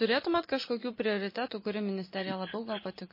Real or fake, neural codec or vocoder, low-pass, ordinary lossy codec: real; none; 5.4 kHz; MP3, 24 kbps